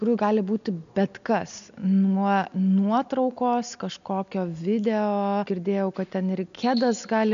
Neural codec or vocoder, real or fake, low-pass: none; real; 7.2 kHz